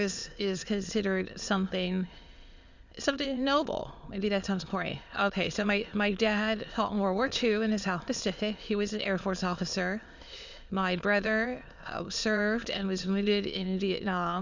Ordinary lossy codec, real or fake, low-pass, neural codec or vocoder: Opus, 64 kbps; fake; 7.2 kHz; autoencoder, 22.05 kHz, a latent of 192 numbers a frame, VITS, trained on many speakers